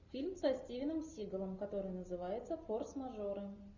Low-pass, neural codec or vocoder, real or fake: 7.2 kHz; none; real